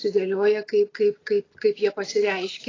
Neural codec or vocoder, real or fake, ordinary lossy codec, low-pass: none; real; AAC, 32 kbps; 7.2 kHz